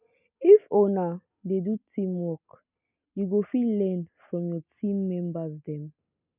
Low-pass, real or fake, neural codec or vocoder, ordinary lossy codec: 3.6 kHz; real; none; none